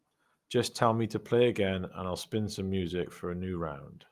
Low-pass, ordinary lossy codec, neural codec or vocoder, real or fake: 14.4 kHz; Opus, 32 kbps; none; real